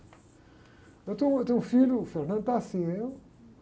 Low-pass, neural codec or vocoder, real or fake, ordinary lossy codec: none; none; real; none